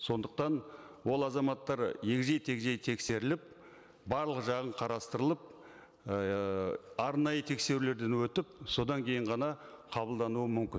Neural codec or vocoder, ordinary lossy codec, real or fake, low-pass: none; none; real; none